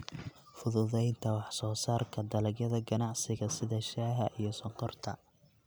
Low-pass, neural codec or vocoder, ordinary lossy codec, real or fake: none; none; none; real